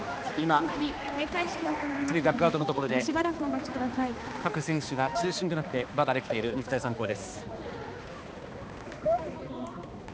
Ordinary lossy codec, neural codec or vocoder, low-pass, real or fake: none; codec, 16 kHz, 2 kbps, X-Codec, HuBERT features, trained on balanced general audio; none; fake